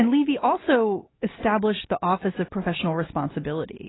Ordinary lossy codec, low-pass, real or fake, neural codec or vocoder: AAC, 16 kbps; 7.2 kHz; real; none